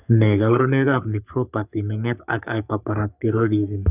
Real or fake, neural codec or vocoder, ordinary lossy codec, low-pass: fake; codec, 44.1 kHz, 3.4 kbps, Pupu-Codec; none; 3.6 kHz